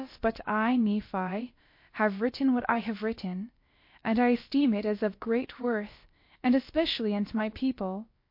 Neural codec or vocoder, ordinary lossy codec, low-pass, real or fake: codec, 16 kHz, about 1 kbps, DyCAST, with the encoder's durations; MP3, 32 kbps; 5.4 kHz; fake